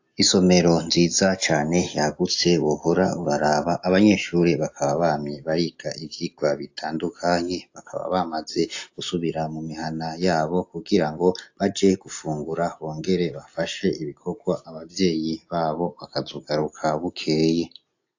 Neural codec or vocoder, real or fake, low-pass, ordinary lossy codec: none; real; 7.2 kHz; AAC, 48 kbps